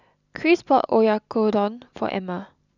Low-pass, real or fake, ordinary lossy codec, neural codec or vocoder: 7.2 kHz; real; none; none